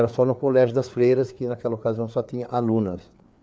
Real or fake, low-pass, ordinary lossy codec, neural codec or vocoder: fake; none; none; codec, 16 kHz, 4 kbps, FreqCodec, larger model